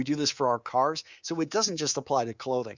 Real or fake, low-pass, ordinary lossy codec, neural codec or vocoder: fake; 7.2 kHz; Opus, 64 kbps; codec, 24 kHz, 3.1 kbps, DualCodec